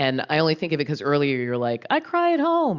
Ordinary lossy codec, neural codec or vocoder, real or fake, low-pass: Opus, 64 kbps; none; real; 7.2 kHz